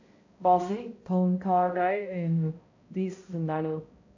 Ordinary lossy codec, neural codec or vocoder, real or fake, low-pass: AAC, 48 kbps; codec, 16 kHz, 0.5 kbps, X-Codec, HuBERT features, trained on balanced general audio; fake; 7.2 kHz